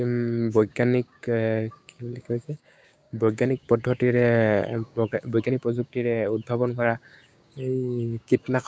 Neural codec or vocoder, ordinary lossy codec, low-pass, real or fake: codec, 16 kHz, 6 kbps, DAC; none; none; fake